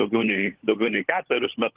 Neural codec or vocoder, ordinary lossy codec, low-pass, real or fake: codec, 16 kHz, 16 kbps, FunCodec, trained on LibriTTS, 50 frames a second; Opus, 16 kbps; 3.6 kHz; fake